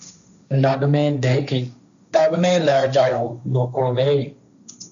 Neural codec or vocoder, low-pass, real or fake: codec, 16 kHz, 1.1 kbps, Voila-Tokenizer; 7.2 kHz; fake